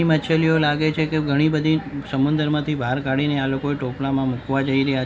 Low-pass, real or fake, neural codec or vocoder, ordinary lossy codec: none; real; none; none